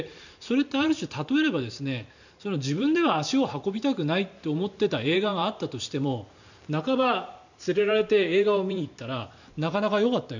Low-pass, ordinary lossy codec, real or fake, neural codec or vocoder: 7.2 kHz; none; fake; vocoder, 44.1 kHz, 128 mel bands every 512 samples, BigVGAN v2